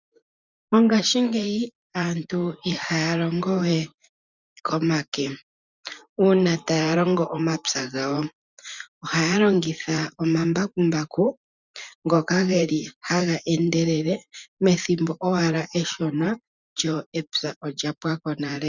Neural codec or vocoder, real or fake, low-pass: vocoder, 44.1 kHz, 128 mel bands every 512 samples, BigVGAN v2; fake; 7.2 kHz